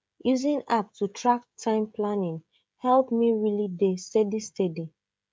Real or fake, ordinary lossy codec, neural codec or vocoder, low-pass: fake; none; codec, 16 kHz, 16 kbps, FreqCodec, smaller model; none